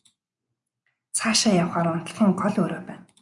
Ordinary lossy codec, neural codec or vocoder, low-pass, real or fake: MP3, 96 kbps; vocoder, 24 kHz, 100 mel bands, Vocos; 10.8 kHz; fake